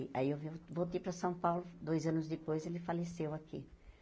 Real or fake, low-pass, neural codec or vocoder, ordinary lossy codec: real; none; none; none